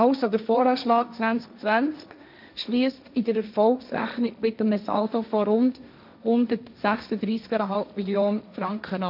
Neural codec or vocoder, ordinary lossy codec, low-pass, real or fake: codec, 16 kHz, 1.1 kbps, Voila-Tokenizer; none; 5.4 kHz; fake